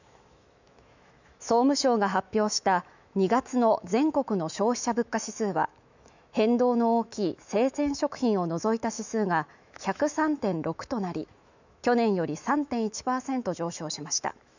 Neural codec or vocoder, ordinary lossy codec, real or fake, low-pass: autoencoder, 48 kHz, 128 numbers a frame, DAC-VAE, trained on Japanese speech; none; fake; 7.2 kHz